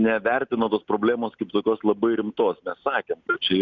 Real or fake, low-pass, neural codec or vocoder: real; 7.2 kHz; none